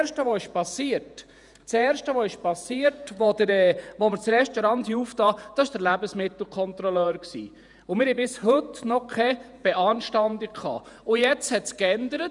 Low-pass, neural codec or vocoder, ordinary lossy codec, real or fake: 10.8 kHz; vocoder, 48 kHz, 128 mel bands, Vocos; none; fake